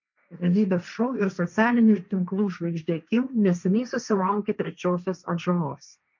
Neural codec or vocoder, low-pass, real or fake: codec, 16 kHz, 1.1 kbps, Voila-Tokenizer; 7.2 kHz; fake